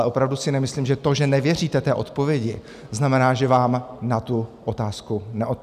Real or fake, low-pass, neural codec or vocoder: real; 14.4 kHz; none